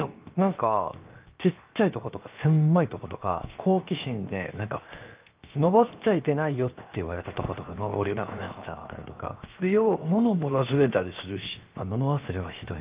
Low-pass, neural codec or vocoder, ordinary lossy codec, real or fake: 3.6 kHz; codec, 16 kHz, 0.7 kbps, FocalCodec; Opus, 24 kbps; fake